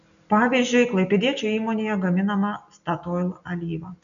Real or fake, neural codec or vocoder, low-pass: real; none; 7.2 kHz